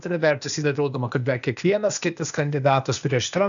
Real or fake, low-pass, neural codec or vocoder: fake; 7.2 kHz; codec, 16 kHz, 0.8 kbps, ZipCodec